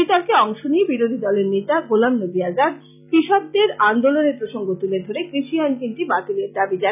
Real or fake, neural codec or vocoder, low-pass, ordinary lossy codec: real; none; 3.6 kHz; none